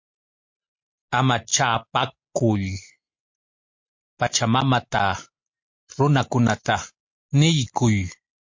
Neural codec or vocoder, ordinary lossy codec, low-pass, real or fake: none; MP3, 48 kbps; 7.2 kHz; real